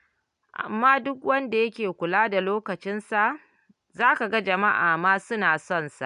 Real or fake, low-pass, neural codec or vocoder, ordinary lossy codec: real; 10.8 kHz; none; MP3, 96 kbps